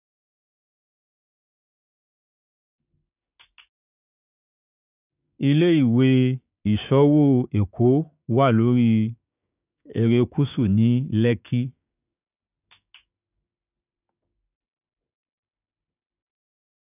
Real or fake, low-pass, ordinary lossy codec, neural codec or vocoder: fake; 3.6 kHz; none; codec, 16 kHz, 6 kbps, DAC